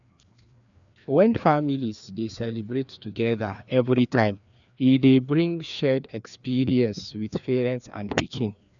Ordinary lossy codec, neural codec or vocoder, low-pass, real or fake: none; codec, 16 kHz, 2 kbps, FreqCodec, larger model; 7.2 kHz; fake